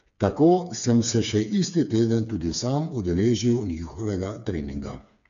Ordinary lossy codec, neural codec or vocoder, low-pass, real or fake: none; codec, 16 kHz, 4 kbps, FreqCodec, smaller model; 7.2 kHz; fake